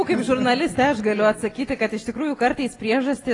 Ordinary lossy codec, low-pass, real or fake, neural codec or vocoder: AAC, 32 kbps; 10.8 kHz; real; none